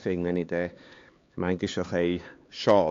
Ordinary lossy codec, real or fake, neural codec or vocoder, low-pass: AAC, 48 kbps; fake; codec, 16 kHz, 4 kbps, X-Codec, HuBERT features, trained on LibriSpeech; 7.2 kHz